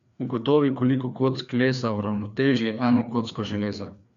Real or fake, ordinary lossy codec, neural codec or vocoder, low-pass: fake; none; codec, 16 kHz, 2 kbps, FreqCodec, larger model; 7.2 kHz